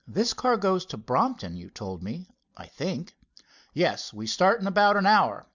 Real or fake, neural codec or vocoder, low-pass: real; none; 7.2 kHz